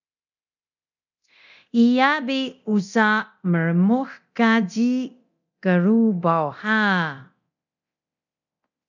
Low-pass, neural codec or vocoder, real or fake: 7.2 kHz; codec, 24 kHz, 0.9 kbps, DualCodec; fake